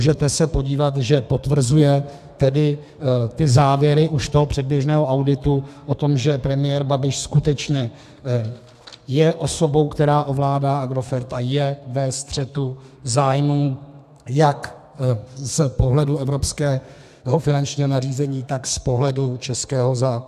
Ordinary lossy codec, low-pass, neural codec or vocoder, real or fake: AAC, 96 kbps; 14.4 kHz; codec, 32 kHz, 1.9 kbps, SNAC; fake